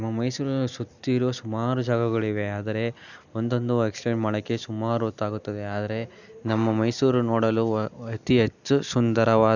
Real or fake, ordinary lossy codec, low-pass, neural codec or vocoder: real; none; 7.2 kHz; none